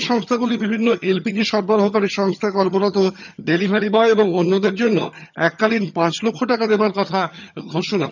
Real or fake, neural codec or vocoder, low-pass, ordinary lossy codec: fake; vocoder, 22.05 kHz, 80 mel bands, HiFi-GAN; 7.2 kHz; none